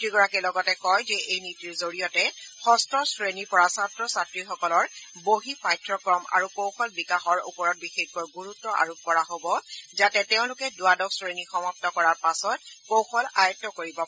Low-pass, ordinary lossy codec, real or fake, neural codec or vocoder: none; none; real; none